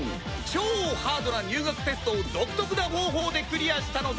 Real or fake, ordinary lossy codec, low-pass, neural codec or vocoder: real; none; none; none